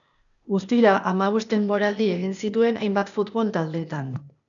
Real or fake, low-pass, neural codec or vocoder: fake; 7.2 kHz; codec, 16 kHz, 0.8 kbps, ZipCodec